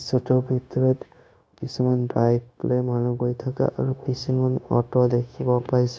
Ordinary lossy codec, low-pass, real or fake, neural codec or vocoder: none; none; fake; codec, 16 kHz, 0.9 kbps, LongCat-Audio-Codec